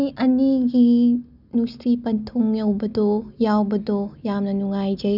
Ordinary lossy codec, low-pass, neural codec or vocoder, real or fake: none; 5.4 kHz; none; real